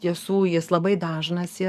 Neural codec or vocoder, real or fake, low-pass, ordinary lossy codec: codec, 44.1 kHz, 7.8 kbps, DAC; fake; 14.4 kHz; MP3, 96 kbps